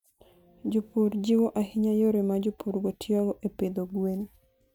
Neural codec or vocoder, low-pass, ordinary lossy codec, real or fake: none; 19.8 kHz; none; real